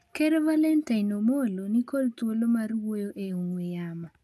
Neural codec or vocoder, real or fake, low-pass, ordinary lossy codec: none; real; 14.4 kHz; none